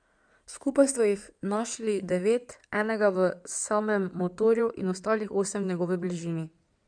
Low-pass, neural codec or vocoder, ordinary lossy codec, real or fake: 9.9 kHz; codec, 16 kHz in and 24 kHz out, 2.2 kbps, FireRedTTS-2 codec; none; fake